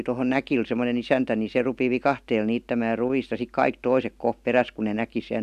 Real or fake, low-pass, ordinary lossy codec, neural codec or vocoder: real; 14.4 kHz; none; none